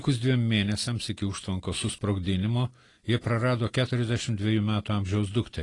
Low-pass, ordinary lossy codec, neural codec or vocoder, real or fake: 10.8 kHz; AAC, 32 kbps; none; real